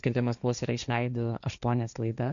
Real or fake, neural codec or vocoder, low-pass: fake; codec, 16 kHz, 1.1 kbps, Voila-Tokenizer; 7.2 kHz